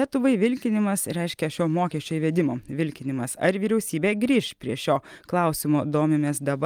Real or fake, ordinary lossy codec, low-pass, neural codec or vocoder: real; Opus, 32 kbps; 19.8 kHz; none